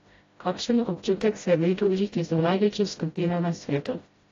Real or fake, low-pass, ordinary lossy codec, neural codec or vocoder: fake; 7.2 kHz; AAC, 32 kbps; codec, 16 kHz, 0.5 kbps, FreqCodec, smaller model